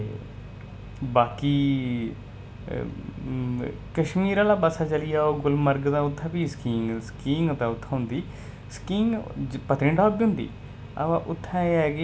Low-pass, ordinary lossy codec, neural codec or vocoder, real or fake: none; none; none; real